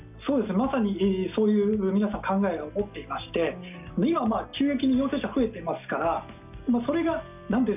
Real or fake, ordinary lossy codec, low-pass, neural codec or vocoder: real; none; 3.6 kHz; none